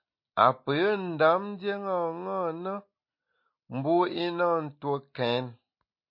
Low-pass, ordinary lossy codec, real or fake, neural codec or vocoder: 5.4 kHz; MP3, 32 kbps; real; none